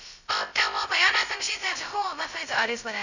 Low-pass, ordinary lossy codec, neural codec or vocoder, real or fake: 7.2 kHz; none; codec, 16 kHz, 0.2 kbps, FocalCodec; fake